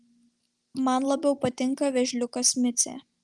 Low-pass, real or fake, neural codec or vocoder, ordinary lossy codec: 10.8 kHz; real; none; Opus, 24 kbps